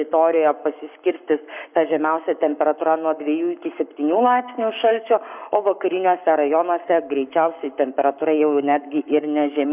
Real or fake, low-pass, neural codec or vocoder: fake; 3.6 kHz; codec, 44.1 kHz, 7.8 kbps, Pupu-Codec